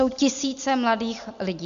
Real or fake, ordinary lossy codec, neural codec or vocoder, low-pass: real; MP3, 96 kbps; none; 7.2 kHz